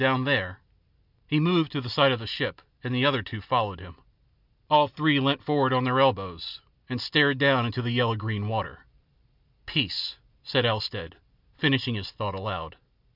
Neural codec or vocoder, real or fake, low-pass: none; real; 5.4 kHz